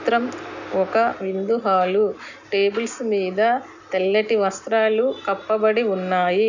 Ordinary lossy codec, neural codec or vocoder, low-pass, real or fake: none; none; 7.2 kHz; real